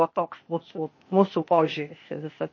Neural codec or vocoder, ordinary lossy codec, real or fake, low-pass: codec, 16 kHz, 0.8 kbps, ZipCodec; MP3, 32 kbps; fake; 7.2 kHz